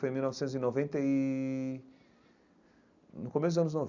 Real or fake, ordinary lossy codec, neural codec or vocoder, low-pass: real; none; none; 7.2 kHz